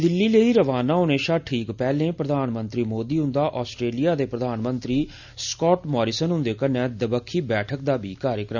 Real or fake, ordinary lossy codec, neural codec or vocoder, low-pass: real; none; none; 7.2 kHz